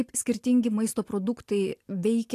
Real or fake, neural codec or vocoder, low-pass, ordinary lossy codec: real; none; 14.4 kHz; AAC, 64 kbps